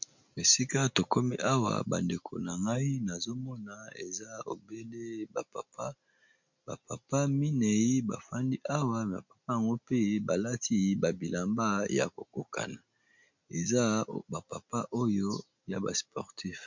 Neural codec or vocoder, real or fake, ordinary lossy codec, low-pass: none; real; MP3, 64 kbps; 7.2 kHz